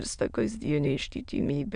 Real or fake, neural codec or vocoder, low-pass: fake; autoencoder, 22.05 kHz, a latent of 192 numbers a frame, VITS, trained on many speakers; 9.9 kHz